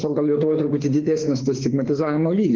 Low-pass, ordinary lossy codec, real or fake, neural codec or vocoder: 7.2 kHz; Opus, 16 kbps; fake; autoencoder, 48 kHz, 32 numbers a frame, DAC-VAE, trained on Japanese speech